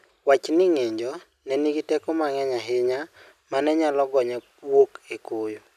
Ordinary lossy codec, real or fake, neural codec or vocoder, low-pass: none; real; none; 14.4 kHz